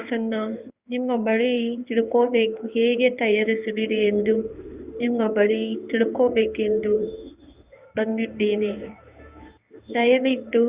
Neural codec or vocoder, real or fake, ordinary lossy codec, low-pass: codec, 16 kHz in and 24 kHz out, 1 kbps, XY-Tokenizer; fake; Opus, 64 kbps; 3.6 kHz